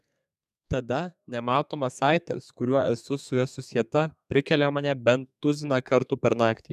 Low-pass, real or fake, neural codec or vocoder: 14.4 kHz; fake; codec, 32 kHz, 1.9 kbps, SNAC